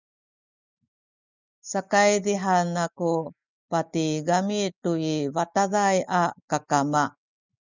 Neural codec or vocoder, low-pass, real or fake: none; 7.2 kHz; real